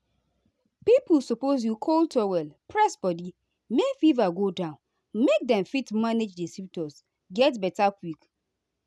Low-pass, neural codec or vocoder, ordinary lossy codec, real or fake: none; none; none; real